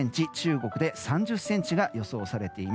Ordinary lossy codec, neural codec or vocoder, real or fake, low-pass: none; none; real; none